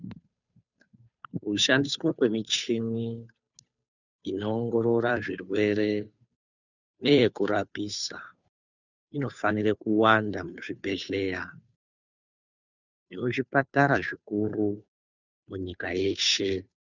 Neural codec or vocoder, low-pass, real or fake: codec, 16 kHz, 2 kbps, FunCodec, trained on Chinese and English, 25 frames a second; 7.2 kHz; fake